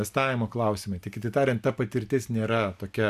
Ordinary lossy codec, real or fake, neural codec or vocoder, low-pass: AAC, 96 kbps; real; none; 14.4 kHz